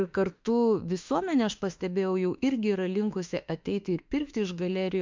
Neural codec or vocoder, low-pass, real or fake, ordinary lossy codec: autoencoder, 48 kHz, 32 numbers a frame, DAC-VAE, trained on Japanese speech; 7.2 kHz; fake; MP3, 64 kbps